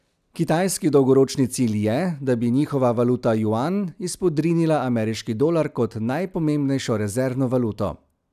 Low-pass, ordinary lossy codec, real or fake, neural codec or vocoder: 14.4 kHz; none; real; none